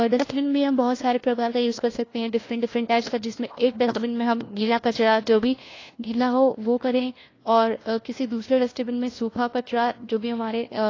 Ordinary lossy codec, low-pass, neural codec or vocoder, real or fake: AAC, 32 kbps; 7.2 kHz; codec, 16 kHz, 1 kbps, FunCodec, trained on LibriTTS, 50 frames a second; fake